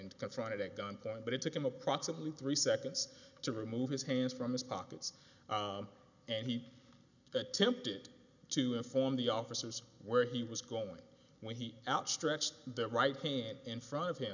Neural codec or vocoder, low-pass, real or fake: none; 7.2 kHz; real